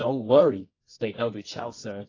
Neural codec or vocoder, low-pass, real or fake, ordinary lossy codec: codec, 24 kHz, 0.9 kbps, WavTokenizer, medium music audio release; 7.2 kHz; fake; AAC, 32 kbps